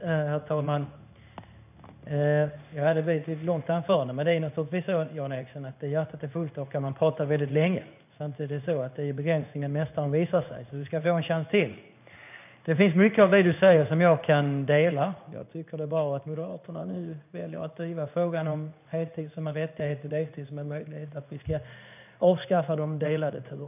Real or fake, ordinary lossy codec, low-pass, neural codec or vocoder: fake; none; 3.6 kHz; codec, 16 kHz in and 24 kHz out, 1 kbps, XY-Tokenizer